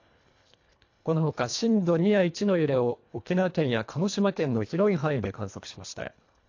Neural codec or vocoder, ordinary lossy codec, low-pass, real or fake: codec, 24 kHz, 1.5 kbps, HILCodec; AAC, 48 kbps; 7.2 kHz; fake